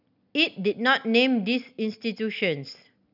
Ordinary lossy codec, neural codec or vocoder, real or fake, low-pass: none; none; real; 5.4 kHz